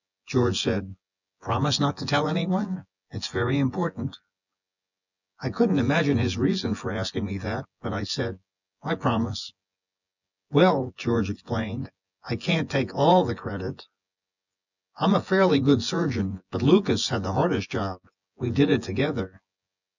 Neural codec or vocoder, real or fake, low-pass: vocoder, 24 kHz, 100 mel bands, Vocos; fake; 7.2 kHz